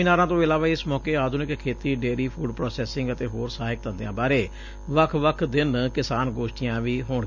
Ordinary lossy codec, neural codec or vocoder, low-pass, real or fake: none; none; 7.2 kHz; real